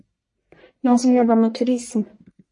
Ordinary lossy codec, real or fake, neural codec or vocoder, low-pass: MP3, 48 kbps; fake; codec, 44.1 kHz, 1.7 kbps, Pupu-Codec; 10.8 kHz